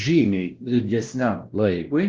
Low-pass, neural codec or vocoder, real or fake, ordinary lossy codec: 7.2 kHz; codec, 16 kHz, 1 kbps, X-Codec, WavLM features, trained on Multilingual LibriSpeech; fake; Opus, 16 kbps